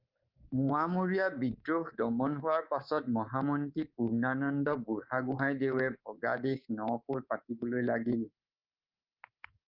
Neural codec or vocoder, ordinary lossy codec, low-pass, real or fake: codec, 24 kHz, 3.1 kbps, DualCodec; Opus, 24 kbps; 5.4 kHz; fake